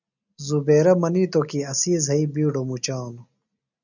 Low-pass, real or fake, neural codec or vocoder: 7.2 kHz; real; none